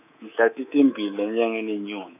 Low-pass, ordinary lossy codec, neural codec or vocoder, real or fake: 3.6 kHz; none; codec, 24 kHz, 3.1 kbps, DualCodec; fake